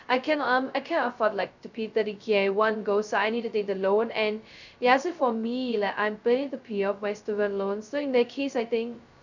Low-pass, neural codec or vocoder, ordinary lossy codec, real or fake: 7.2 kHz; codec, 16 kHz, 0.2 kbps, FocalCodec; none; fake